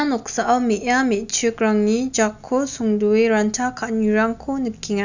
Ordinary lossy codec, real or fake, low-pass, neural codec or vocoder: none; real; 7.2 kHz; none